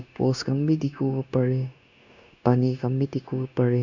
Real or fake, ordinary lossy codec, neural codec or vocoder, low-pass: fake; none; codec, 16 kHz, 0.9 kbps, LongCat-Audio-Codec; 7.2 kHz